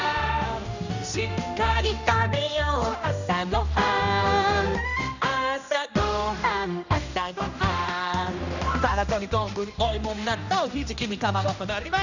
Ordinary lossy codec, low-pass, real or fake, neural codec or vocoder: none; 7.2 kHz; fake; codec, 16 kHz, 1 kbps, X-Codec, HuBERT features, trained on general audio